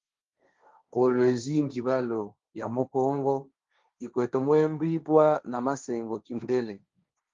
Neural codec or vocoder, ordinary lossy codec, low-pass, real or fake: codec, 16 kHz, 1.1 kbps, Voila-Tokenizer; Opus, 32 kbps; 7.2 kHz; fake